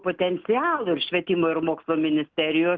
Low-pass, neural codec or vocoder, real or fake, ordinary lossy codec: 7.2 kHz; none; real; Opus, 32 kbps